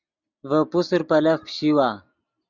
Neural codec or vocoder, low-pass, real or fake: none; 7.2 kHz; real